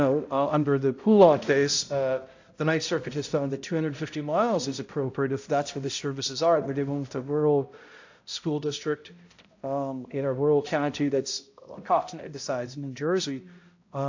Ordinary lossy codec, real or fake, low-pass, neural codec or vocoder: AAC, 48 kbps; fake; 7.2 kHz; codec, 16 kHz, 0.5 kbps, X-Codec, HuBERT features, trained on balanced general audio